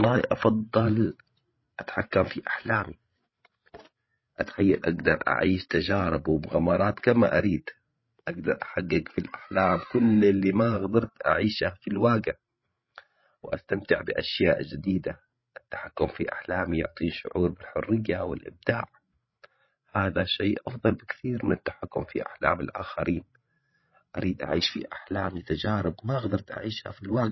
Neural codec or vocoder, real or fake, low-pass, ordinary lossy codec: codec, 16 kHz, 16 kbps, FreqCodec, larger model; fake; 7.2 kHz; MP3, 24 kbps